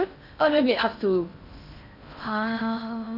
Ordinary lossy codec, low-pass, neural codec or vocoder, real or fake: none; 5.4 kHz; codec, 16 kHz in and 24 kHz out, 0.6 kbps, FocalCodec, streaming, 2048 codes; fake